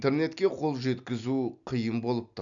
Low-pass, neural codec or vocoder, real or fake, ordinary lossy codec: 7.2 kHz; none; real; none